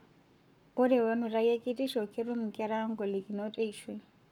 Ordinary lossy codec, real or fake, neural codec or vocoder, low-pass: none; fake; codec, 44.1 kHz, 7.8 kbps, Pupu-Codec; 19.8 kHz